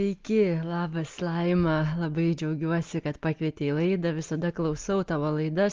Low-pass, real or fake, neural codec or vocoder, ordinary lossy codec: 7.2 kHz; real; none; Opus, 32 kbps